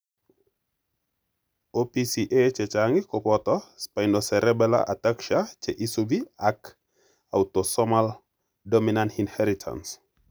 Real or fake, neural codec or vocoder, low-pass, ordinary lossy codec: real; none; none; none